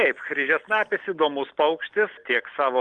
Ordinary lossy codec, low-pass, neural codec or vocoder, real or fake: Opus, 24 kbps; 10.8 kHz; none; real